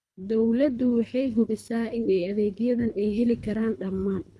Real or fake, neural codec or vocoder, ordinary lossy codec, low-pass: fake; codec, 24 kHz, 3 kbps, HILCodec; none; none